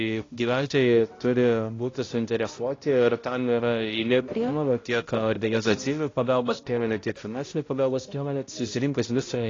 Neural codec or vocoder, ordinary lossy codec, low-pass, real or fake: codec, 16 kHz, 0.5 kbps, X-Codec, HuBERT features, trained on balanced general audio; AAC, 32 kbps; 7.2 kHz; fake